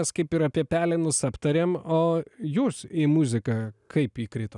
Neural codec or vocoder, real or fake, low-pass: none; real; 10.8 kHz